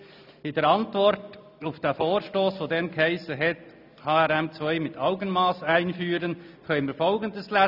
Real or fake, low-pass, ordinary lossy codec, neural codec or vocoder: real; 5.4 kHz; none; none